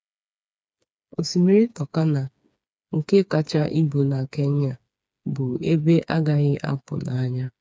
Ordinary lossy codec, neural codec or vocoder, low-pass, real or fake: none; codec, 16 kHz, 4 kbps, FreqCodec, smaller model; none; fake